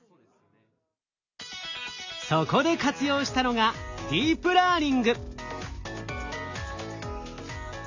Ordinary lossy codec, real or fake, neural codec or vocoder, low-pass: AAC, 48 kbps; real; none; 7.2 kHz